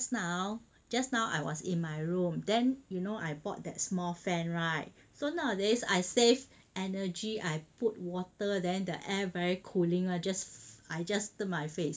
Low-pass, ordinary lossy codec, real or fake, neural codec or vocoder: none; none; real; none